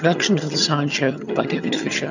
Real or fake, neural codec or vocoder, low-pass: fake; vocoder, 22.05 kHz, 80 mel bands, HiFi-GAN; 7.2 kHz